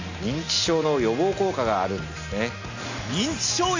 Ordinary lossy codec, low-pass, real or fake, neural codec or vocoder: Opus, 64 kbps; 7.2 kHz; real; none